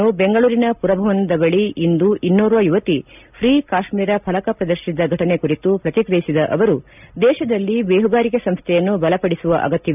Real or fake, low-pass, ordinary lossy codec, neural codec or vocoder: real; 3.6 kHz; none; none